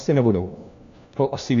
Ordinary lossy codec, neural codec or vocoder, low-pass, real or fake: AAC, 64 kbps; codec, 16 kHz, 1 kbps, FunCodec, trained on LibriTTS, 50 frames a second; 7.2 kHz; fake